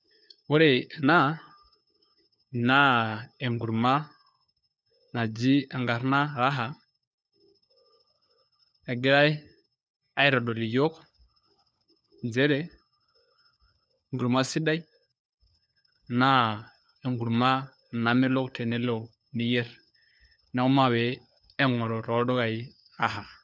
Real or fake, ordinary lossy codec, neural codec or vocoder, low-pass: fake; none; codec, 16 kHz, 4 kbps, FunCodec, trained on LibriTTS, 50 frames a second; none